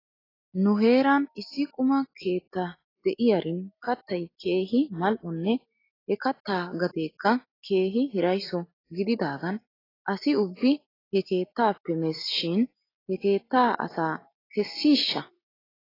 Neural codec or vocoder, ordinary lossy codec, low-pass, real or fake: none; AAC, 24 kbps; 5.4 kHz; real